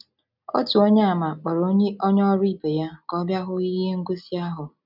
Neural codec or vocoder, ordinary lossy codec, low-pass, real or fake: none; none; 5.4 kHz; real